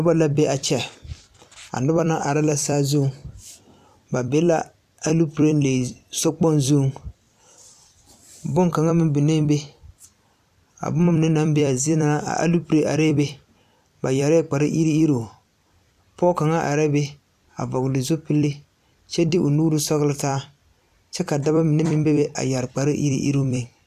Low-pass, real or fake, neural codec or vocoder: 14.4 kHz; fake; vocoder, 44.1 kHz, 128 mel bands every 256 samples, BigVGAN v2